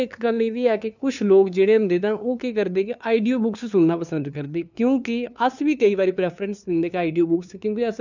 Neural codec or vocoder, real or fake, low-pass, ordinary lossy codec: codec, 16 kHz, 2 kbps, FunCodec, trained on LibriTTS, 25 frames a second; fake; 7.2 kHz; none